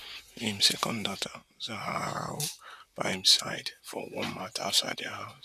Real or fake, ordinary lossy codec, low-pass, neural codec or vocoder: fake; none; 14.4 kHz; vocoder, 44.1 kHz, 128 mel bands, Pupu-Vocoder